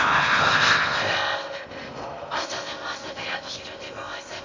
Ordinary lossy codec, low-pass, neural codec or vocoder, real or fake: MP3, 48 kbps; 7.2 kHz; codec, 16 kHz in and 24 kHz out, 0.6 kbps, FocalCodec, streaming, 4096 codes; fake